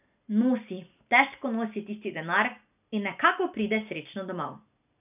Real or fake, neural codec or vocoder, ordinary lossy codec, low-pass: real; none; none; 3.6 kHz